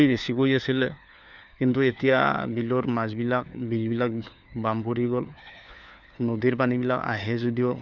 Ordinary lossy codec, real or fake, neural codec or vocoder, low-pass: none; fake; codec, 16 kHz, 4 kbps, FunCodec, trained on LibriTTS, 50 frames a second; 7.2 kHz